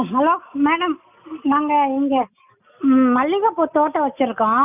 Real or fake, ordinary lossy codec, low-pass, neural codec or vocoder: real; none; 3.6 kHz; none